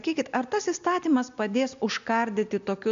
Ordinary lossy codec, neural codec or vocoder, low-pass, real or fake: MP3, 64 kbps; none; 7.2 kHz; real